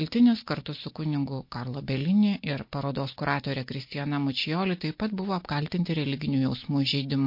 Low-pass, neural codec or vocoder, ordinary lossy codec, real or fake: 5.4 kHz; none; MP3, 32 kbps; real